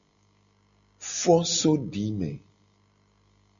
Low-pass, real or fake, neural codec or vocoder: 7.2 kHz; real; none